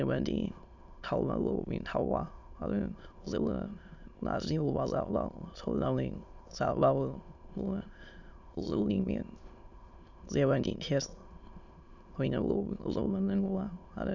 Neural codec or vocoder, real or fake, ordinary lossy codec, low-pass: autoencoder, 22.05 kHz, a latent of 192 numbers a frame, VITS, trained on many speakers; fake; none; 7.2 kHz